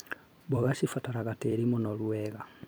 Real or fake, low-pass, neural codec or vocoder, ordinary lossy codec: fake; none; vocoder, 44.1 kHz, 128 mel bands every 256 samples, BigVGAN v2; none